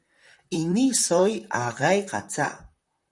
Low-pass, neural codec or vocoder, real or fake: 10.8 kHz; vocoder, 44.1 kHz, 128 mel bands, Pupu-Vocoder; fake